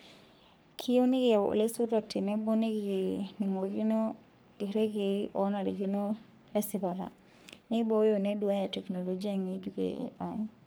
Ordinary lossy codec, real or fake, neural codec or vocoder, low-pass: none; fake; codec, 44.1 kHz, 3.4 kbps, Pupu-Codec; none